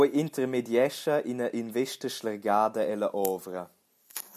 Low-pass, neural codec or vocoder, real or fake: 14.4 kHz; none; real